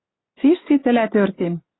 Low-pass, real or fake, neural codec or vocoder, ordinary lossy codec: 7.2 kHz; real; none; AAC, 16 kbps